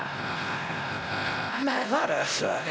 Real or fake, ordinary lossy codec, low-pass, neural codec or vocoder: fake; none; none; codec, 16 kHz, 0.5 kbps, X-Codec, WavLM features, trained on Multilingual LibriSpeech